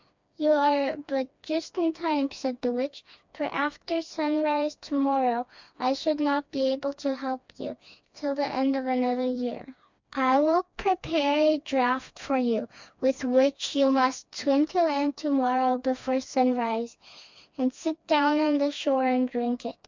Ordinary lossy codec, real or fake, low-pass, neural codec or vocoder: MP3, 64 kbps; fake; 7.2 kHz; codec, 16 kHz, 2 kbps, FreqCodec, smaller model